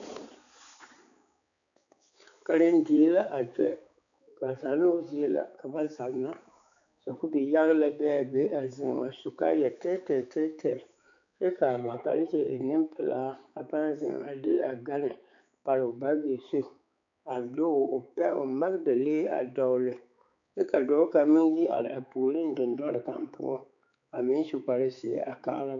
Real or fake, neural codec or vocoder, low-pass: fake; codec, 16 kHz, 4 kbps, X-Codec, HuBERT features, trained on balanced general audio; 7.2 kHz